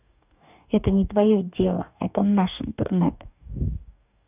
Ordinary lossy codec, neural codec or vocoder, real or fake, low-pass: none; codec, 44.1 kHz, 2.6 kbps, DAC; fake; 3.6 kHz